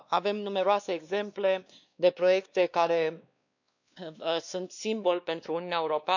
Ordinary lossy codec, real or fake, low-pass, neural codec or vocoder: none; fake; 7.2 kHz; codec, 16 kHz, 2 kbps, X-Codec, WavLM features, trained on Multilingual LibriSpeech